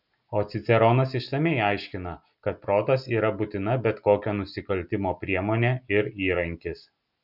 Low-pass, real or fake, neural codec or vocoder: 5.4 kHz; real; none